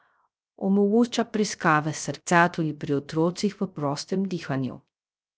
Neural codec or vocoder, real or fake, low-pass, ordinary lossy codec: codec, 16 kHz, 0.3 kbps, FocalCodec; fake; none; none